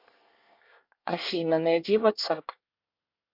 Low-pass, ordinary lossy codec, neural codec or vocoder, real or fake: 5.4 kHz; MP3, 48 kbps; codec, 24 kHz, 1 kbps, SNAC; fake